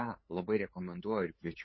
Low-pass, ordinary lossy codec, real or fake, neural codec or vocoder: 7.2 kHz; MP3, 24 kbps; fake; codec, 16 kHz, 8 kbps, FunCodec, trained on LibriTTS, 25 frames a second